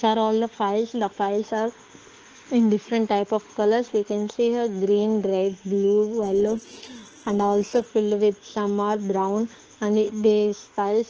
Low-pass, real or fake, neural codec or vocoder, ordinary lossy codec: 7.2 kHz; fake; autoencoder, 48 kHz, 32 numbers a frame, DAC-VAE, trained on Japanese speech; Opus, 16 kbps